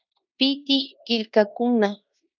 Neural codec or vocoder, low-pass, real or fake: codec, 16 kHz in and 24 kHz out, 0.9 kbps, LongCat-Audio-Codec, fine tuned four codebook decoder; 7.2 kHz; fake